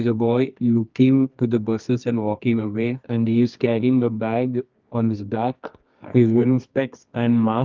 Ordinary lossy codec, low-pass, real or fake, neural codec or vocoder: Opus, 24 kbps; 7.2 kHz; fake; codec, 24 kHz, 0.9 kbps, WavTokenizer, medium music audio release